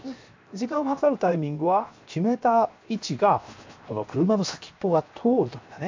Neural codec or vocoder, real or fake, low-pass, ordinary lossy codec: codec, 16 kHz, 0.7 kbps, FocalCodec; fake; 7.2 kHz; MP3, 64 kbps